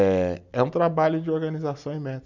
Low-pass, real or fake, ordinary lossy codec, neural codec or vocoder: 7.2 kHz; real; none; none